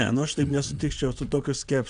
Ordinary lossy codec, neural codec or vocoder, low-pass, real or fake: AAC, 96 kbps; vocoder, 22.05 kHz, 80 mel bands, WaveNeXt; 9.9 kHz; fake